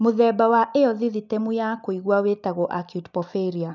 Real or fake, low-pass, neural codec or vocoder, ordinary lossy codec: real; 7.2 kHz; none; none